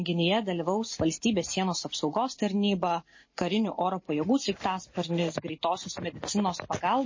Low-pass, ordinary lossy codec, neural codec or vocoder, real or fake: 7.2 kHz; MP3, 32 kbps; none; real